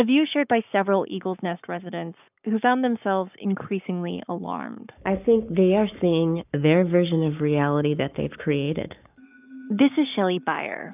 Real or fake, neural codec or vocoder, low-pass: fake; codec, 44.1 kHz, 7.8 kbps, Pupu-Codec; 3.6 kHz